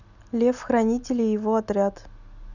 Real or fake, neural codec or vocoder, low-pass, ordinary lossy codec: real; none; 7.2 kHz; none